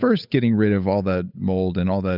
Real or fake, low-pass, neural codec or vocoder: fake; 5.4 kHz; codec, 16 kHz, 8 kbps, FunCodec, trained on Chinese and English, 25 frames a second